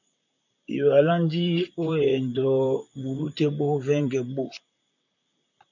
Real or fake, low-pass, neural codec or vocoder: fake; 7.2 kHz; vocoder, 44.1 kHz, 128 mel bands, Pupu-Vocoder